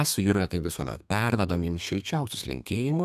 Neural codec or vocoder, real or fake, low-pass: codec, 32 kHz, 1.9 kbps, SNAC; fake; 14.4 kHz